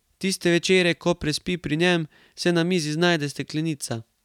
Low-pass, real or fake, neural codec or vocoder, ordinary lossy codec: 19.8 kHz; real; none; none